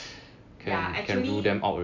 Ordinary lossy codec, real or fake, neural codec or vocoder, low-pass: MP3, 64 kbps; real; none; 7.2 kHz